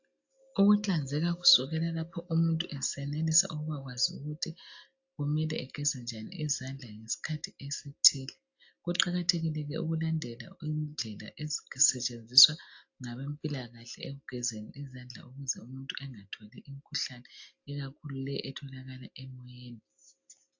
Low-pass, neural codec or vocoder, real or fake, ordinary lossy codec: 7.2 kHz; none; real; AAC, 48 kbps